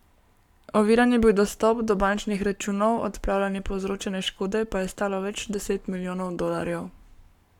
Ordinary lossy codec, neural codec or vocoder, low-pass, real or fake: none; codec, 44.1 kHz, 7.8 kbps, Pupu-Codec; 19.8 kHz; fake